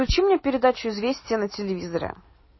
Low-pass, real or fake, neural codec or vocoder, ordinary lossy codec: 7.2 kHz; real; none; MP3, 24 kbps